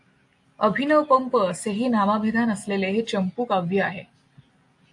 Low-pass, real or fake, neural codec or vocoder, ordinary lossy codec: 10.8 kHz; real; none; AAC, 64 kbps